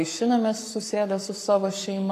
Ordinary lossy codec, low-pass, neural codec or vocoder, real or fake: AAC, 48 kbps; 14.4 kHz; codec, 44.1 kHz, 7.8 kbps, Pupu-Codec; fake